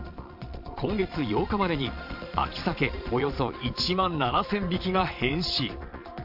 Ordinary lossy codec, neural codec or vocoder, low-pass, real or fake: AAC, 48 kbps; vocoder, 22.05 kHz, 80 mel bands, Vocos; 5.4 kHz; fake